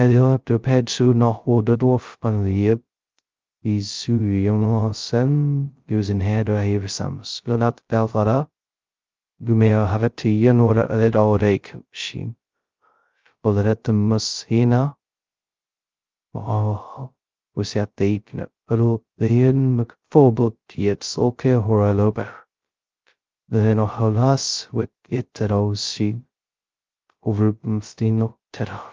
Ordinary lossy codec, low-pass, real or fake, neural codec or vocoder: Opus, 24 kbps; 7.2 kHz; fake; codec, 16 kHz, 0.2 kbps, FocalCodec